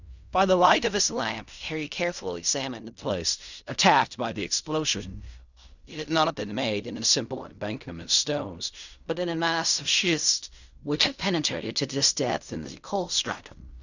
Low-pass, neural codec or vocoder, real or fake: 7.2 kHz; codec, 16 kHz in and 24 kHz out, 0.4 kbps, LongCat-Audio-Codec, fine tuned four codebook decoder; fake